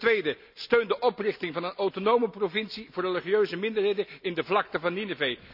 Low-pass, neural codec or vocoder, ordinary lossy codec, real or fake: 5.4 kHz; none; none; real